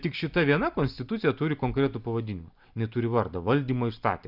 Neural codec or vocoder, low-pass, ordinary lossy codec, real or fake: none; 5.4 kHz; Opus, 64 kbps; real